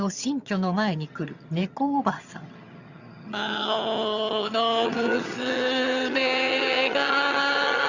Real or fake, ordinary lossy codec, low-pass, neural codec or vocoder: fake; Opus, 64 kbps; 7.2 kHz; vocoder, 22.05 kHz, 80 mel bands, HiFi-GAN